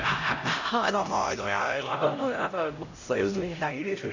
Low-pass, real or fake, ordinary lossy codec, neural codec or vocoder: 7.2 kHz; fake; none; codec, 16 kHz, 0.5 kbps, X-Codec, HuBERT features, trained on LibriSpeech